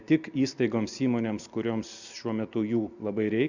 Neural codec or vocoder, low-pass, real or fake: none; 7.2 kHz; real